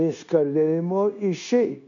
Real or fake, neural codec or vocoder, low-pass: fake; codec, 16 kHz, 0.9 kbps, LongCat-Audio-Codec; 7.2 kHz